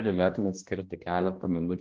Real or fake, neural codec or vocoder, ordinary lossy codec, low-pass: fake; codec, 16 kHz, 1 kbps, X-Codec, HuBERT features, trained on balanced general audio; Opus, 16 kbps; 7.2 kHz